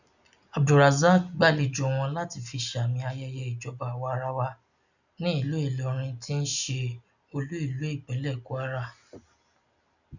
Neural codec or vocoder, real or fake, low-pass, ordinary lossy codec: none; real; 7.2 kHz; none